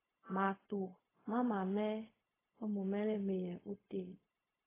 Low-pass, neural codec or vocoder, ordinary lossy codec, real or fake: 3.6 kHz; codec, 16 kHz, 0.4 kbps, LongCat-Audio-Codec; AAC, 16 kbps; fake